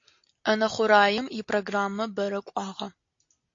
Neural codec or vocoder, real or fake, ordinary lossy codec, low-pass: none; real; AAC, 48 kbps; 7.2 kHz